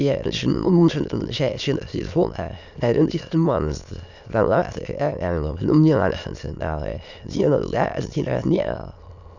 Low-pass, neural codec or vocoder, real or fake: 7.2 kHz; autoencoder, 22.05 kHz, a latent of 192 numbers a frame, VITS, trained on many speakers; fake